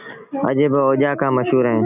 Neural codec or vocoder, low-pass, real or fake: none; 3.6 kHz; real